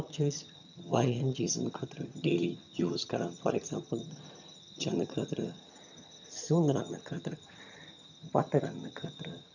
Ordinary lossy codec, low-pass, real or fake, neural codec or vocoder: none; 7.2 kHz; fake; vocoder, 22.05 kHz, 80 mel bands, HiFi-GAN